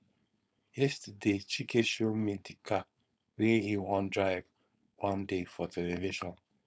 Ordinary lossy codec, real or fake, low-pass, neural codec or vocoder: none; fake; none; codec, 16 kHz, 4.8 kbps, FACodec